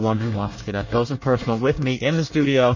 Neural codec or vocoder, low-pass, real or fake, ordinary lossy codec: codec, 24 kHz, 1 kbps, SNAC; 7.2 kHz; fake; MP3, 32 kbps